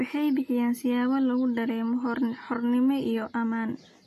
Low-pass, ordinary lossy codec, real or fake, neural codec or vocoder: 14.4 kHz; AAC, 48 kbps; real; none